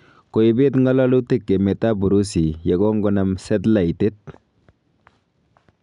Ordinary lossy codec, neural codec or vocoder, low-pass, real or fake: none; none; 10.8 kHz; real